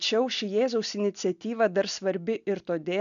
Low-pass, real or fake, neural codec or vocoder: 7.2 kHz; real; none